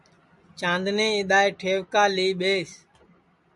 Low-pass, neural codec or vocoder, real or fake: 10.8 kHz; none; real